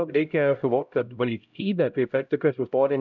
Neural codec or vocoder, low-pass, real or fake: codec, 16 kHz, 0.5 kbps, X-Codec, HuBERT features, trained on LibriSpeech; 7.2 kHz; fake